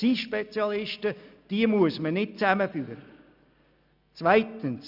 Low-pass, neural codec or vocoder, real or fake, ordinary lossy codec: 5.4 kHz; none; real; none